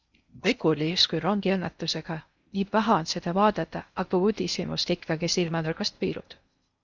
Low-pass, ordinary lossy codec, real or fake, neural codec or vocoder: 7.2 kHz; Opus, 64 kbps; fake; codec, 16 kHz in and 24 kHz out, 0.6 kbps, FocalCodec, streaming, 2048 codes